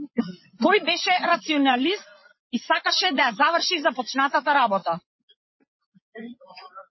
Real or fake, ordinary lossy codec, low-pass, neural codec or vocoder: fake; MP3, 24 kbps; 7.2 kHz; vocoder, 24 kHz, 100 mel bands, Vocos